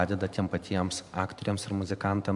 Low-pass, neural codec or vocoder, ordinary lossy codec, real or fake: 10.8 kHz; vocoder, 44.1 kHz, 128 mel bands every 512 samples, BigVGAN v2; MP3, 96 kbps; fake